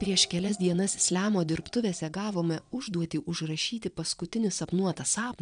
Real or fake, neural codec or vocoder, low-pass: fake; vocoder, 22.05 kHz, 80 mel bands, Vocos; 9.9 kHz